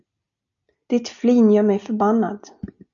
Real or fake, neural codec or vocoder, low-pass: real; none; 7.2 kHz